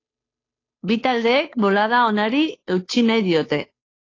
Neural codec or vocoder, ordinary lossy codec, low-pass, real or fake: codec, 16 kHz, 2 kbps, FunCodec, trained on Chinese and English, 25 frames a second; AAC, 32 kbps; 7.2 kHz; fake